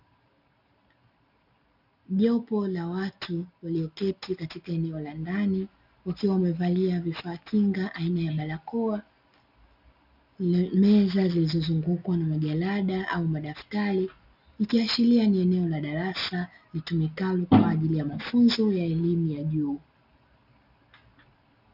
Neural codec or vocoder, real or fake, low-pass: none; real; 5.4 kHz